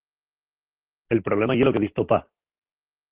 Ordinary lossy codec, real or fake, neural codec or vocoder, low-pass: Opus, 16 kbps; real; none; 3.6 kHz